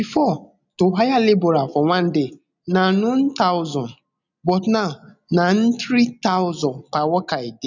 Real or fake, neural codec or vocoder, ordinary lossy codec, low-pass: real; none; none; 7.2 kHz